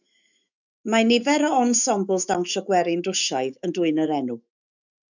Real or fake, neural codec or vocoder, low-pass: fake; autoencoder, 48 kHz, 128 numbers a frame, DAC-VAE, trained on Japanese speech; 7.2 kHz